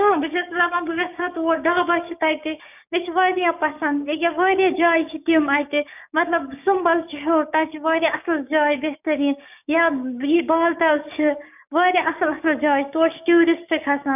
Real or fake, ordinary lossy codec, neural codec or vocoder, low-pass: fake; none; codec, 16 kHz, 6 kbps, DAC; 3.6 kHz